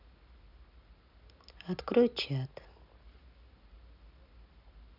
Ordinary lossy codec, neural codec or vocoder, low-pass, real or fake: none; none; 5.4 kHz; real